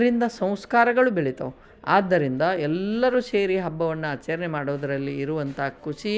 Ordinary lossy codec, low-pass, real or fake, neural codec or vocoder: none; none; real; none